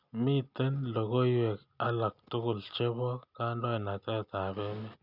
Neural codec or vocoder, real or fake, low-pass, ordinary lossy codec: none; real; 5.4 kHz; none